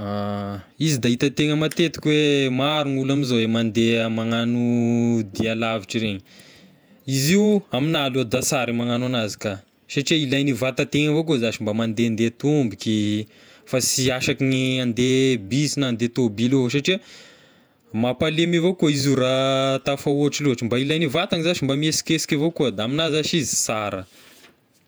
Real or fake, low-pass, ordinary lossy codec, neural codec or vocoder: fake; none; none; vocoder, 48 kHz, 128 mel bands, Vocos